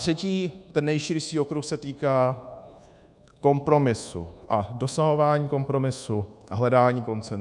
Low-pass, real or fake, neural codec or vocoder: 10.8 kHz; fake; codec, 24 kHz, 1.2 kbps, DualCodec